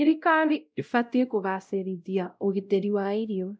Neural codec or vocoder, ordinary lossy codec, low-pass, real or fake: codec, 16 kHz, 0.5 kbps, X-Codec, WavLM features, trained on Multilingual LibriSpeech; none; none; fake